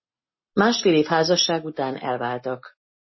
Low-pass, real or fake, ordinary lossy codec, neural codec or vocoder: 7.2 kHz; real; MP3, 24 kbps; none